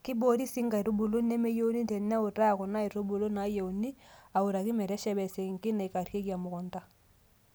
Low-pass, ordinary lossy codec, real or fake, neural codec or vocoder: none; none; real; none